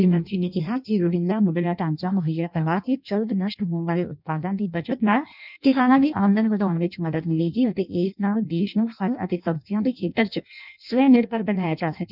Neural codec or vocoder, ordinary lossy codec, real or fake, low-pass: codec, 16 kHz in and 24 kHz out, 0.6 kbps, FireRedTTS-2 codec; none; fake; 5.4 kHz